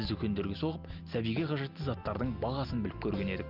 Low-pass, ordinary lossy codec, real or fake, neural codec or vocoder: 5.4 kHz; Opus, 24 kbps; real; none